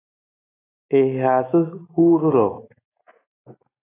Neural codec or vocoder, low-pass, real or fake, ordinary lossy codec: none; 3.6 kHz; real; AAC, 16 kbps